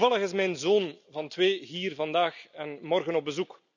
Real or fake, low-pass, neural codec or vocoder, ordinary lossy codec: real; 7.2 kHz; none; none